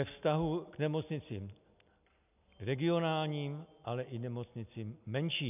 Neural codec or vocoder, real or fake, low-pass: none; real; 3.6 kHz